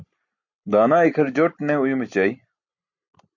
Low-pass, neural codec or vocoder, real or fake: 7.2 kHz; none; real